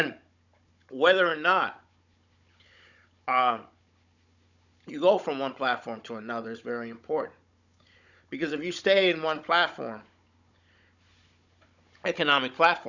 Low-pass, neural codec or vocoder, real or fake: 7.2 kHz; codec, 16 kHz, 16 kbps, FunCodec, trained on Chinese and English, 50 frames a second; fake